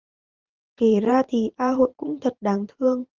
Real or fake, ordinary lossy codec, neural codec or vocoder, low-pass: fake; Opus, 32 kbps; vocoder, 44.1 kHz, 128 mel bands every 512 samples, BigVGAN v2; 7.2 kHz